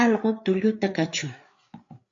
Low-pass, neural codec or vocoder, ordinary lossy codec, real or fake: 7.2 kHz; codec, 16 kHz, 4 kbps, FreqCodec, larger model; AAC, 48 kbps; fake